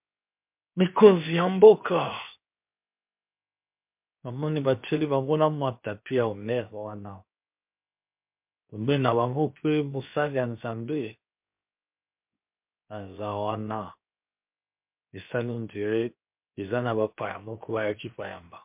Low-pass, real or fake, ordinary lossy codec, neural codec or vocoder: 3.6 kHz; fake; MP3, 32 kbps; codec, 16 kHz, 0.7 kbps, FocalCodec